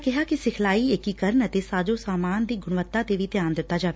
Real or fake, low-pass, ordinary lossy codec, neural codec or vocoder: real; none; none; none